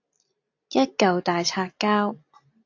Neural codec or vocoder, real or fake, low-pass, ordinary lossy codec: none; real; 7.2 kHz; AAC, 48 kbps